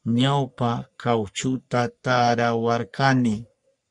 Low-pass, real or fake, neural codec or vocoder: 10.8 kHz; fake; codec, 44.1 kHz, 3.4 kbps, Pupu-Codec